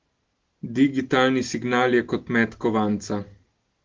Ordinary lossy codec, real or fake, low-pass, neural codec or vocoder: Opus, 16 kbps; real; 7.2 kHz; none